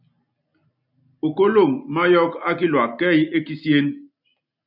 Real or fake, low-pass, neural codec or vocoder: real; 5.4 kHz; none